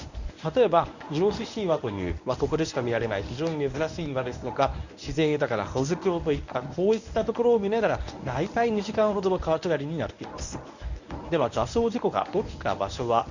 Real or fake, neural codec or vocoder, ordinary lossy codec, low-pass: fake; codec, 24 kHz, 0.9 kbps, WavTokenizer, medium speech release version 1; none; 7.2 kHz